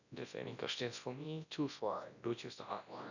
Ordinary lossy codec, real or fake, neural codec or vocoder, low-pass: none; fake; codec, 24 kHz, 0.9 kbps, WavTokenizer, large speech release; 7.2 kHz